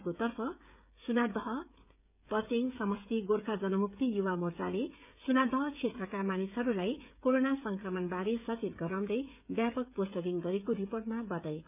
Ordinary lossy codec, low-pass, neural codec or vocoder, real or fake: none; 3.6 kHz; codec, 16 kHz, 16 kbps, FreqCodec, smaller model; fake